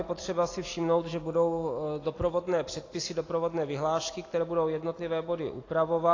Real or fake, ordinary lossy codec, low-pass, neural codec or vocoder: real; AAC, 32 kbps; 7.2 kHz; none